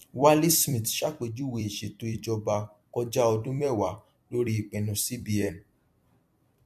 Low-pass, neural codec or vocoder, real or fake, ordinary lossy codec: 14.4 kHz; vocoder, 44.1 kHz, 128 mel bands every 256 samples, BigVGAN v2; fake; MP3, 64 kbps